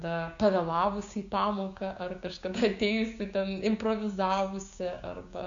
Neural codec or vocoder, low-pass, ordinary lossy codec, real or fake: codec, 16 kHz, 6 kbps, DAC; 7.2 kHz; Opus, 64 kbps; fake